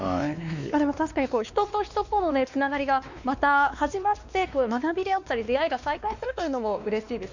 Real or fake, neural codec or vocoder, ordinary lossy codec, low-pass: fake; codec, 16 kHz, 2 kbps, X-Codec, WavLM features, trained on Multilingual LibriSpeech; none; 7.2 kHz